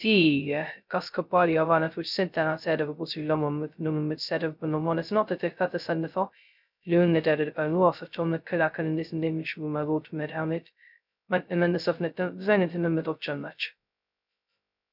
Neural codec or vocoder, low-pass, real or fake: codec, 16 kHz, 0.2 kbps, FocalCodec; 5.4 kHz; fake